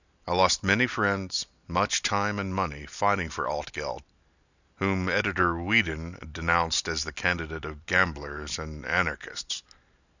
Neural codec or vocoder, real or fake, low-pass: none; real; 7.2 kHz